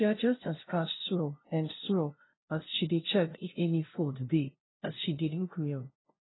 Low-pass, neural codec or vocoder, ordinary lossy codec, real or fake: 7.2 kHz; codec, 16 kHz, 0.5 kbps, FunCodec, trained on LibriTTS, 25 frames a second; AAC, 16 kbps; fake